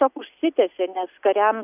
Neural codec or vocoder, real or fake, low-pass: none; real; 3.6 kHz